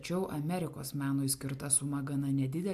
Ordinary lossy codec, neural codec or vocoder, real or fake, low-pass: AAC, 96 kbps; none; real; 14.4 kHz